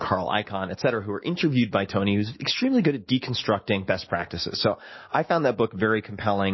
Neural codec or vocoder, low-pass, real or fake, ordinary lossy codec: autoencoder, 48 kHz, 128 numbers a frame, DAC-VAE, trained on Japanese speech; 7.2 kHz; fake; MP3, 24 kbps